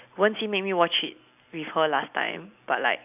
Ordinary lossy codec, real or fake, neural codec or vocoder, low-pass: none; real; none; 3.6 kHz